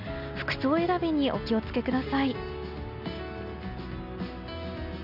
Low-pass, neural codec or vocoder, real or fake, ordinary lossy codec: 5.4 kHz; none; real; none